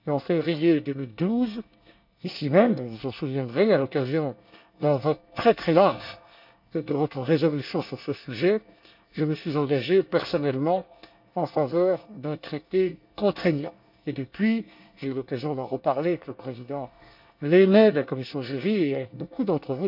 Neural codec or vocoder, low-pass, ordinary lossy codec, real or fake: codec, 24 kHz, 1 kbps, SNAC; 5.4 kHz; none; fake